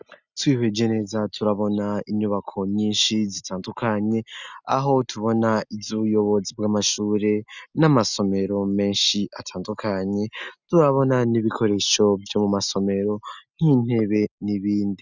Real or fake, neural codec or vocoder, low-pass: real; none; 7.2 kHz